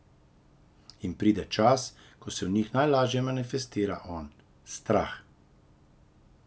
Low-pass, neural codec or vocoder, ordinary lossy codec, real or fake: none; none; none; real